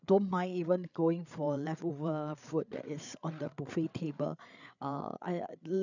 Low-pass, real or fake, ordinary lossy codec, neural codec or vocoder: 7.2 kHz; fake; none; codec, 16 kHz, 8 kbps, FreqCodec, larger model